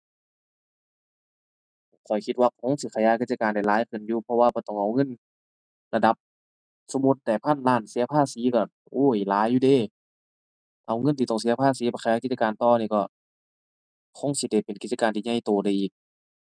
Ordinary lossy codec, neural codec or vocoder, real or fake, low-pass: none; none; real; 9.9 kHz